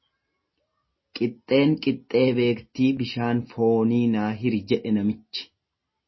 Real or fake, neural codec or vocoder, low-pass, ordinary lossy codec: real; none; 7.2 kHz; MP3, 24 kbps